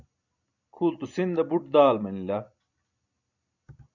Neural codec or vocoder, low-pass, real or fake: none; 7.2 kHz; real